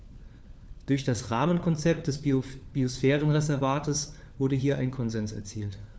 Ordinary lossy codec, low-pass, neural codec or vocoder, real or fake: none; none; codec, 16 kHz, 4 kbps, FunCodec, trained on LibriTTS, 50 frames a second; fake